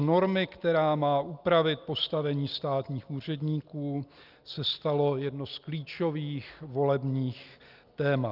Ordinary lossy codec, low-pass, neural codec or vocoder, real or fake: Opus, 24 kbps; 5.4 kHz; none; real